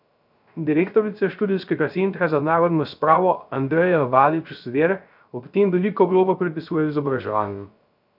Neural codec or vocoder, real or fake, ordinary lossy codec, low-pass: codec, 16 kHz, 0.3 kbps, FocalCodec; fake; none; 5.4 kHz